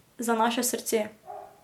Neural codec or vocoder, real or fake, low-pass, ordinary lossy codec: none; real; 19.8 kHz; MP3, 96 kbps